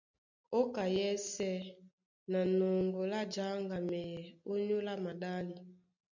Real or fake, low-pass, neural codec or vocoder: real; 7.2 kHz; none